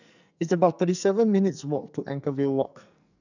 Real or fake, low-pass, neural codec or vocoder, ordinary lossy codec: fake; 7.2 kHz; codec, 44.1 kHz, 2.6 kbps, SNAC; none